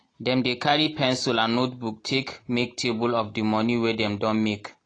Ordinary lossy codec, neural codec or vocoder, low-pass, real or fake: AAC, 32 kbps; none; 9.9 kHz; real